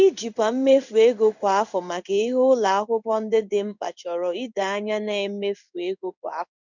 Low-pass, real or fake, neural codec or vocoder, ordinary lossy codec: 7.2 kHz; fake; codec, 16 kHz in and 24 kHz out, 1 kbps, XY-Tokenizer; none